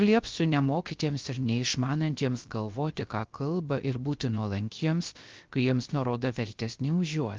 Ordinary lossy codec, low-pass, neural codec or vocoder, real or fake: Opus, 24 kbps; 7.2 kHz; codec, 16 kHz, 0.3 kbps, FocalCodec; fake